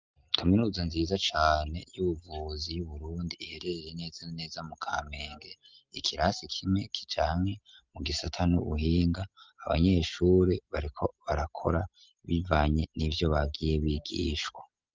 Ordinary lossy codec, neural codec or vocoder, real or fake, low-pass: Opus, 24 kbps; none; real; 7.2 kHz